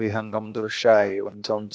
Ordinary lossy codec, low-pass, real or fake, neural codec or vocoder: none; none; fake; codec, 16 kHz, 0.8 kbps, ZipCodec